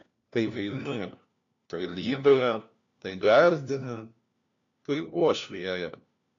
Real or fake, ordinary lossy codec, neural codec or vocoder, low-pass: fake; AAC, 64 kbps; codec, 16 kHz, 1 kbps, FunCodec, trained on LibriTTS, 50 frames a second; 7.2 kHz